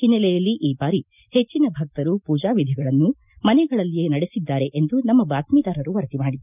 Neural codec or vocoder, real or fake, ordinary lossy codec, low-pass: none; real; none; 3.6 kHz